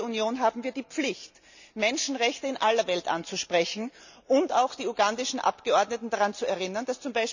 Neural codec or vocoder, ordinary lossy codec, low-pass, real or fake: none; none; 7.2 kHz; real